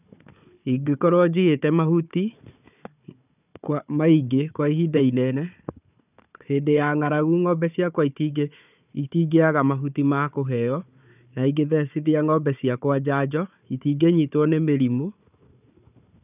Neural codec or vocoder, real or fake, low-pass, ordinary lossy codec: codec, 16 kHz, 4 kbps, FunCodec, trained on Chinese and English, 50 frames a second; fake; 3.6 kHz; none